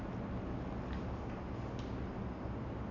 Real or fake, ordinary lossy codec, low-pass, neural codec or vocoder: real; none; 7.2 kHz; none